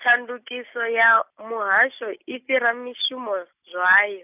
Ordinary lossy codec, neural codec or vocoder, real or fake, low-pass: none; none; real; 3.6 kHz